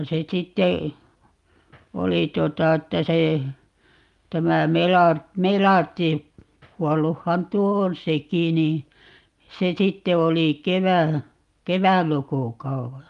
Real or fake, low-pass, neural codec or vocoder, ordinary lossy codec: real; 10.8 kHz; none; Opus, 32 kbps